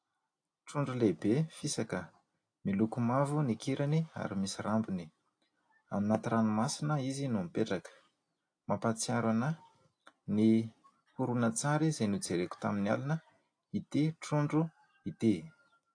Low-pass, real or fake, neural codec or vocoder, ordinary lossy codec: 9.9 kHz; real; none; AAC, 48 kbps